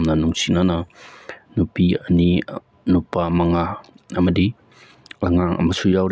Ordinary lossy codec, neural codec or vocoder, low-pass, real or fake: none; none; none; real